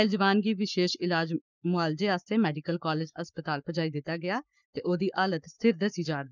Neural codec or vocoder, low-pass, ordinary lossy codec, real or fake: codec, 44.1 kHz, 7.8 kbps, Pupu-Codec; 7.2 kHz; none; fake